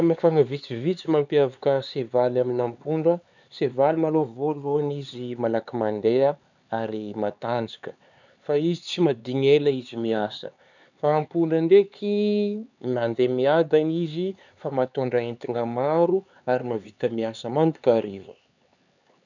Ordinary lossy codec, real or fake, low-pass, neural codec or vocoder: none; fake; 7.2 kHz; codec, 16 kHz, 4 kbps, X-Codec, HuBERT features, trained on LibriSpeech